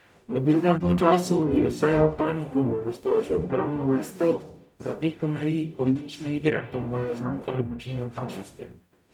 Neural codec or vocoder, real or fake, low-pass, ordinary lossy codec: codec, 44.1 kHz, 0.9 kbps, DAC; fake; 19.8 kHz; none